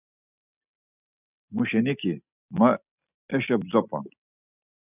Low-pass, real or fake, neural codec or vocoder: 3.6 kHz; real; none